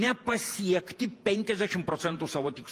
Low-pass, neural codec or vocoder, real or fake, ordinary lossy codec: 14.4 kHz; vocoder, 48 kHz, 128 mel bands, Vocos; fake; Opus, 32 kbps